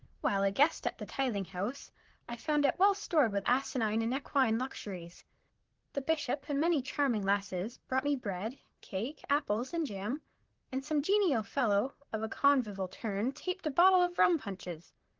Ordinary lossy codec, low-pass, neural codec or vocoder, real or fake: Opus, 16 kbps; 7.2 kHz; vocoder, 44.1 kHz, 128 mel bands, Pupu-Vocoder; fake